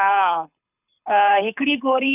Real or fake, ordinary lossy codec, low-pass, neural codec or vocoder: fake; none; 3.6 kHz; codec, 24 kHz, 6 kbps, HILCodec